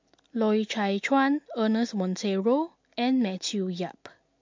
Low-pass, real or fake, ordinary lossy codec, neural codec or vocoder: 7.2 kHz; real; MP3, 48 kbps; none